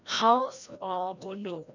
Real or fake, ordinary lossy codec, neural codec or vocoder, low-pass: fake; none; codec, 16 kHz, 1 kbps, FreqCodec, larger model; 7.2 kHz